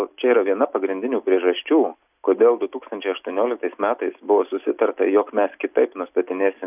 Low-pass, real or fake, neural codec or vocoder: 3.6 kHz; real; none